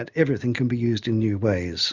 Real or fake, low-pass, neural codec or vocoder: real; 7.2 kHz; none